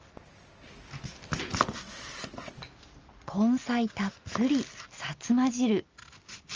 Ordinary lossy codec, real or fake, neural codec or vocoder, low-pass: Opus, 24 kbps; real; none; 7.2 kHz